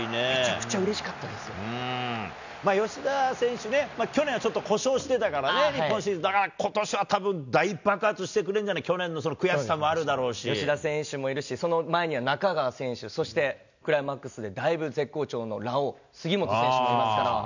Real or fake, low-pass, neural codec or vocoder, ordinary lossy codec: real; 7.2 kHz; none; none